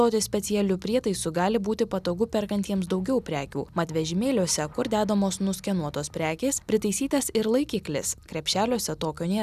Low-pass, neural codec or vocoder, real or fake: 14.4 kHz; none; real